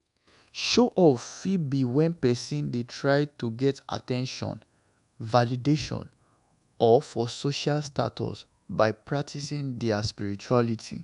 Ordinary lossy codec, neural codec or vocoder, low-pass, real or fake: none; codec, 24 kHz, 1.2 kbps, DualCodec; 10.8 kHz; fake